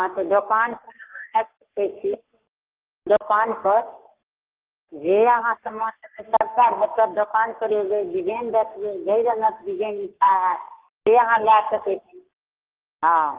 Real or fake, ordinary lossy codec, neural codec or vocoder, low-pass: fake; Opus, 16 kbps; codec, 44.1 kHz, 3.4 kbps, Pupu-Codec; 3.6 kHz